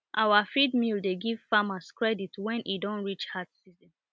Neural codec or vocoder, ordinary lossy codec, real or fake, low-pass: none; none; real; none